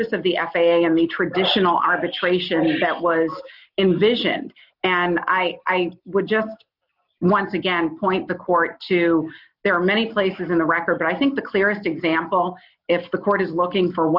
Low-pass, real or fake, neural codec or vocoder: 5.4 kHz; real; none